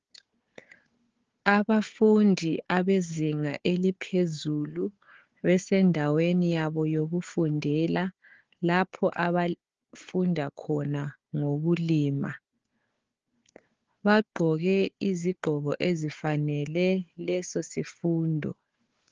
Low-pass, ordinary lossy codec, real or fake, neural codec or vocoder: 7.2 kHz; Opus, 16 kbps; fake; codec, 16 kHz, 4 kbps, FunCodec, trained on Chinese and English, 50 frames a second